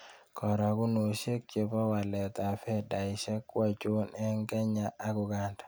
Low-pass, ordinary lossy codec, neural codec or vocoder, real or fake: none; none; none; real